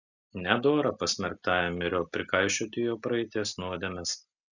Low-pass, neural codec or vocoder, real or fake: 7.2 kHz; none; real